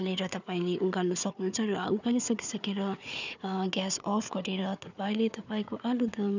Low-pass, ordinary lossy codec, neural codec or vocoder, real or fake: 7.2 kHz; none; codec, 16 kHz, 4 kbps, FunCodec, trained on Chinese and English, 50 frames a second; fake